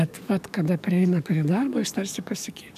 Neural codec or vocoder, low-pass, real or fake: codec, 44.1 kHz, 7.8 kbps, DAC; 14.4 kHz; fake